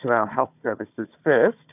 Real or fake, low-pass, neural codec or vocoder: fake; 3.6 kHz; codec, 16 kHz, 4 kbps, FunCodec, trained on Chinese and English, 50 frames a second